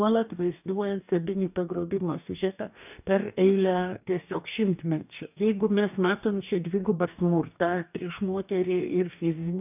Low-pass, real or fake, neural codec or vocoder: 3.6 kHz; fake; codec, 44.1 kHz, 2.6 kbps, DAC